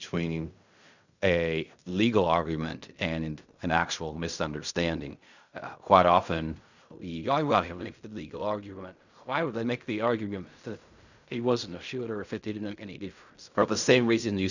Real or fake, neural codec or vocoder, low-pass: fake; codec, 16 kHz in and 24 kHz out, 0.4 kbps, LongCat-Audio-Codec, fine tuned four codebook decoder; 7.2 kHz